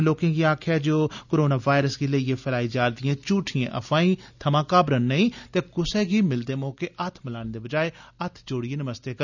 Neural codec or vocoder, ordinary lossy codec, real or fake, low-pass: none; none; real; 7.2 kHz